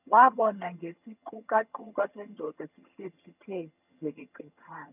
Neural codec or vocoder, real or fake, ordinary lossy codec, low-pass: vocoder, 22.05 kHz, 80 mel bands, HiFi-GAN; fake; none; 3.6 kHz